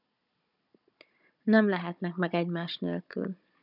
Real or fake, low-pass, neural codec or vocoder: fake; 5.4 kHz; codec, 16 kHz, 16 kbps, FunCodec, trained on Chinese and English, 50 frames a second